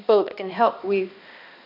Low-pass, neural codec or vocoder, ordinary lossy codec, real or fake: 5.4 kHz; codec, 16 kHz, 1 kbps, X-Codec, HuBERT features, trained on balanced general audio; none; fake